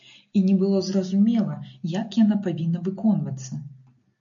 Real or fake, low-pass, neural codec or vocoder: real; 7.2 kHz; none